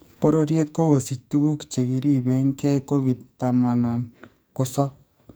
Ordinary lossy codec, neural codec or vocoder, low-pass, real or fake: none; codec, 44.1 kHz, 2.6 kbps, SNAC; none; fake